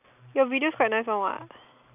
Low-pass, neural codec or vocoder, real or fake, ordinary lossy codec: 3.6 kHz; none; real; none